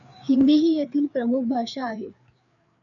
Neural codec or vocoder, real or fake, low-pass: codec, 16 kHz, 4 kbps, FreqCodec, larger model; fake; 7.2 kHz